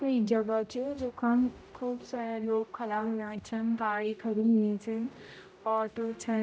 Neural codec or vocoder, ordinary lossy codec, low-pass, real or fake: codec, 16 kHz, 0.5 kbps, X-Codec, HuBERT features, trained on general audio; none; none; fake